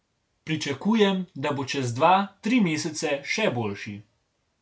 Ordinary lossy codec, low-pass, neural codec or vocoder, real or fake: none; none; none; real